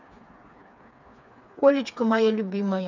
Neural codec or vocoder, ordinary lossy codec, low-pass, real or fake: codec, 16 kHz, 4 kbps, FreqCodec, smaller model; none; 7.2 kHz; fake